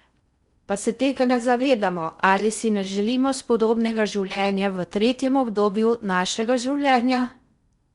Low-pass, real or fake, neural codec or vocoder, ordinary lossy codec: 10.8 kHz; fake; codec, 16 kHz in and 24 kHz out, 0.6 kbps, FocalCodec, streaming, 4096 codes; Opus, 64 kbps